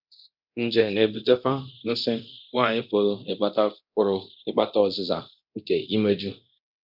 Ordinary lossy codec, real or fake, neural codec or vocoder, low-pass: none; fake; codec, 24 kHz, 0.9 kbps, DualCodec; 5.4 kHz